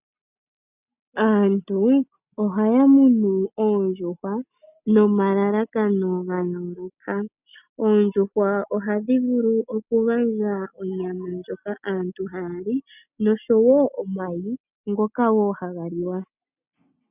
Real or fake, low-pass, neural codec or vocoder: real; 3.6 kHz; none